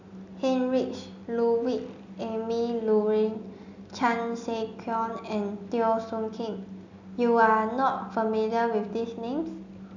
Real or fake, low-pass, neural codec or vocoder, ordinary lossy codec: real; 7.2 kHz; none; none